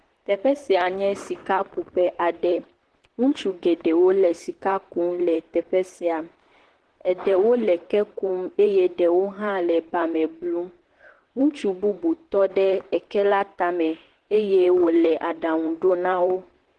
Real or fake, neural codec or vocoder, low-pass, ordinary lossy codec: fake; vocoder, 44.1 kHz, 128 mel bands, Pupu-Vocoder; 10.8 kHz; Opus, 16 kbps